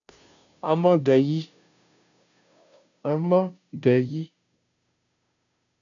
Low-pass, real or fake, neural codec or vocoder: 7.2 kHz; fake; codec, 16 kHz, 0.5 kbps, FunCodec, trained on Chinese and English, 25 frames a second